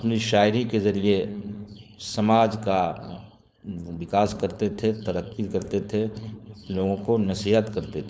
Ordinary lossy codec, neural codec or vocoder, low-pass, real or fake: none; codec, 16 kHz, 4.8 kbps, FACodec; none; fake